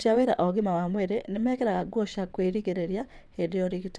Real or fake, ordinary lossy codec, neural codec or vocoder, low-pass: fake; none; vocoder, 22.05 kHz, 80 mel bands, WaveNeXt; none